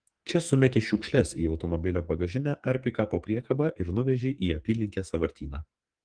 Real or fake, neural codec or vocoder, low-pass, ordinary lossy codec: fake; codec, 44.1 kHz, 2.6 kbps, SNAC; 9.9 kHz; Opus, 24 kbps